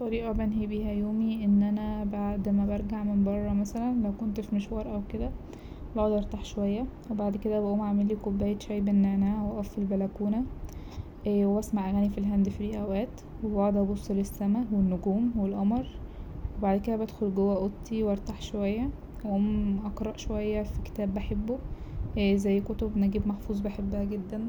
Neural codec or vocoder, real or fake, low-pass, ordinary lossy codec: none; real; 19.8 kHz; none